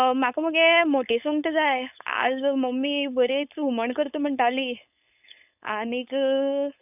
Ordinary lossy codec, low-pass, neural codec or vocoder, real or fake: none; 3.6 kHz; codec, 16 kHz, 4.8 kbps, FACodec; fake